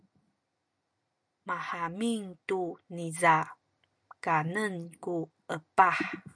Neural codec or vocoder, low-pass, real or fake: none; 9.9 kHz; real